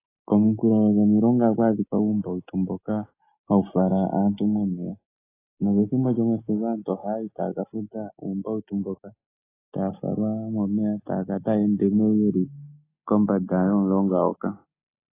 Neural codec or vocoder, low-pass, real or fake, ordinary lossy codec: none; 3.6 kHz; real; AAC, 24 kbps